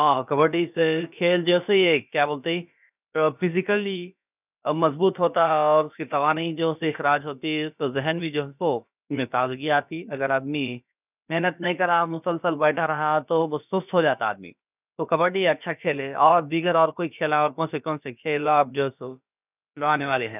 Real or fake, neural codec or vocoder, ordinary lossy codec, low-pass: fake; codec, 16 kHz, about 1 kbps, DyCAST, with the encoder's durations; none; 3.6 kHz